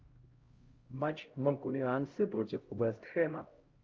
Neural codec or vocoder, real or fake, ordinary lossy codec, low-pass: codec, 16 kHz, 0.5 kbps, X-Codec, HuBERT features, trained on LibriSpeech; fake; Opus, 32 kbps; 7.2 kHz